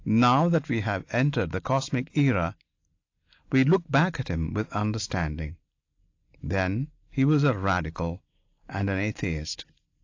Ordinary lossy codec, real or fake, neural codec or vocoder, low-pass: AAC, 48 kbps; real; none; 7.2 kHz